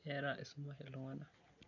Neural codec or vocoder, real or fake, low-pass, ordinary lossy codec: none; real; 7.2 kHz; none